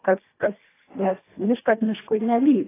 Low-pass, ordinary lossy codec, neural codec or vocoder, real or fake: 3.6 kHz; AAC, 16 kbps; codec, 24 kHz, 1.5 kbps, HILCodec; fake